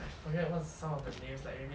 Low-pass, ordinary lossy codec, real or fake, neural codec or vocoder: none; none; real; none